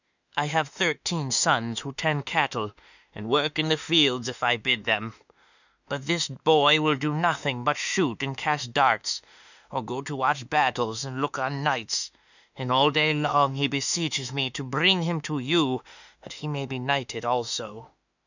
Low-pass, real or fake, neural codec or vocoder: 7.2 kHz; fake; autoencoder, 48 kHz, 32 numbers a frame, DAC-VAE, trained on Japanese speech